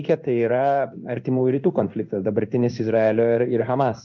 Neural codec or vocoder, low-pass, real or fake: codec, 16 kHz in and 24 kHz out, 1 kbps, XY-Tokenizer; 7.2 kHz; fake